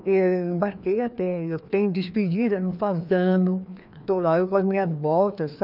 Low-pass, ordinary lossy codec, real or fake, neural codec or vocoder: 5.4 kHz; none; fake; codec, 16 kHz, 2 kbps, FreqCodec, larger model